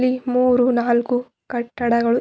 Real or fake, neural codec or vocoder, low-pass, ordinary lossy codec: real; none; none; none